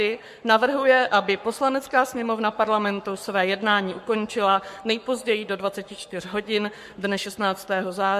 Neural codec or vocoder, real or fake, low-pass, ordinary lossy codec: codec, 44.1 kHz, 7.8 kbps, Pupu-Codec; fake; 14.4 kHz; MP3, 64 kbps